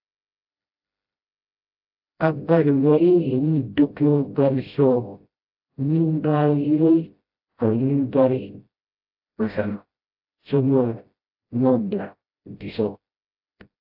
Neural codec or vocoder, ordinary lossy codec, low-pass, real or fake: codec, 16 kHz, 0.5 kbps, FreqCodec, smaller model; AAC, 48 kbps; 5.4 kHz; fake